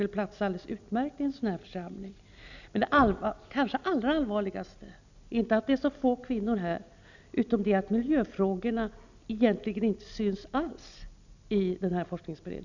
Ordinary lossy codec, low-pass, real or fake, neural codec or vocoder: none; 7.2 kHz; real; none